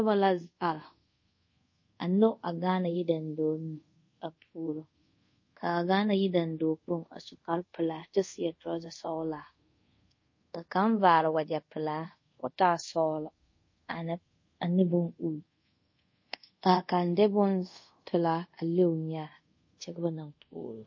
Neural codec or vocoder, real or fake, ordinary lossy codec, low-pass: codec, 24 kHz, 0.5 kbps, DualCodec; fake; MP3, 32 kbps; 7.2 kHz